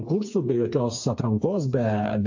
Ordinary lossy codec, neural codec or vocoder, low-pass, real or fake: AAC, 48 kbps; codec, 16 kHz, 4 kbps, FreqCodec, smaller model; 7.2 kHz; fake